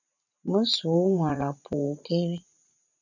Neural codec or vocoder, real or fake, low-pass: vocoder, 44.1 kHz, 80 mel bands, Vocos; fake; 7.2 kHz